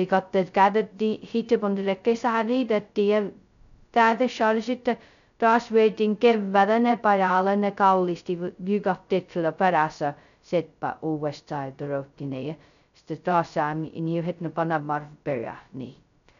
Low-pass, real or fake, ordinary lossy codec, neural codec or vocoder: 7.2 kHz; fake; none; codec, 16 kHz, 0.2 kbps, FocalCodec